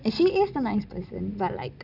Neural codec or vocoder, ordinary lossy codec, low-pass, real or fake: none; none; 5.4 kHz; real